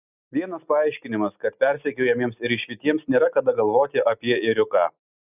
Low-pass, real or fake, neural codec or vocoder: 3.6 kHz; real; none